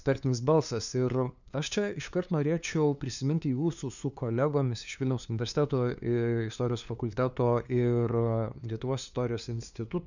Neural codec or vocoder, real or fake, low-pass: codec, 16 kHz, 2 kbps, FunCodec, trained on LibriTTS, 25 frames a second; fake; 7.2 kHz